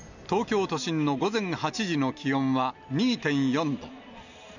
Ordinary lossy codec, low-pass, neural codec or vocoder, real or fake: none; 7.2 kHz; none; real